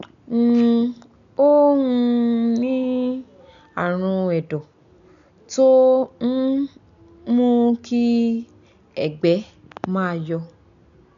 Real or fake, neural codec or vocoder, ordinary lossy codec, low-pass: real; none; none; 7.2 kHz